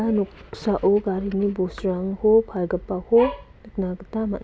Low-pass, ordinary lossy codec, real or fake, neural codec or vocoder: none; none; real; none